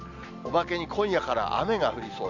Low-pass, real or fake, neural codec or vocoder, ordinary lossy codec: 7.2 kHz; real; none; none